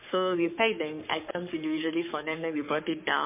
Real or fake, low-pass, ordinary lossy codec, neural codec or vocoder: fake; 3.6 kHz; MP3, 24 kbps; codec, 44.1 kHz, 3.4 kbps, Pupu-Codec